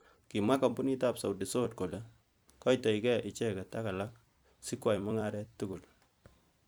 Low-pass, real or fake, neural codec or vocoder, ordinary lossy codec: none; fake; vocoder, 44.1 kHz, 128 mel bands every 256 samples, BigVGAN v2; none